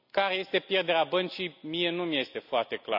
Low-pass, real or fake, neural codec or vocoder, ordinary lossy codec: 5.4 kHz; real; none; none